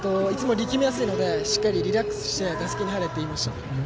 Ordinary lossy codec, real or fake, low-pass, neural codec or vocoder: none; real; none; none